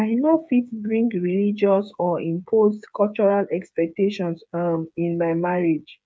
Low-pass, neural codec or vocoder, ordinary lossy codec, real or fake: none; codec, 16 kHz, 8 kbps, FreqCodec, smaller model; none; fake